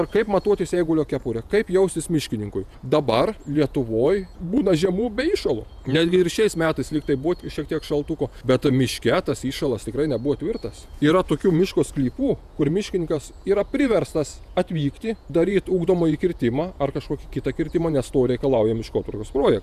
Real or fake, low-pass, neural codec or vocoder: fake; 14.4 kHz; vocoder, 48 kHz, 128 mel bands, Vocos